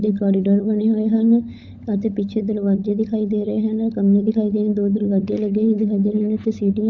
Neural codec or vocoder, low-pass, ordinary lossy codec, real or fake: codec, 16 kHz, 16 kbps, FunCodec, trained on LibriTTS, 50 frames a second; 7.2 kHz; none; fake